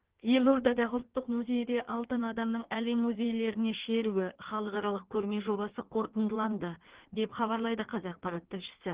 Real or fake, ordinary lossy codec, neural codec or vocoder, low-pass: fake; Opus, 16 kbps; codec, 16 kHz in and 24 kHz out, 1.1 kbps, FireRedTTS-2 codec; 3.6 kHz